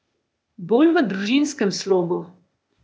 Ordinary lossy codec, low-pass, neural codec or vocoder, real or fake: none; none; codec, 16 kHz, 0.8 kbps, ZipCodec; fake